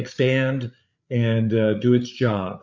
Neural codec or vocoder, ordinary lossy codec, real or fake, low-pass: codec, 16 kHz, 8 kbps, FreqCodec, larger model; MP3, 64 kbps; fake; 7.2 kHz